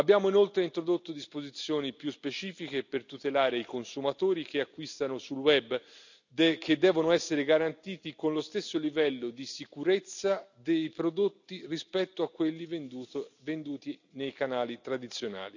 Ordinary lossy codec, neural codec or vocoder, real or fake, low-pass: none; none; real; 7.2 kHz